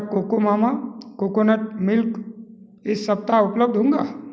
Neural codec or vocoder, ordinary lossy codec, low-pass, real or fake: none; none; none; real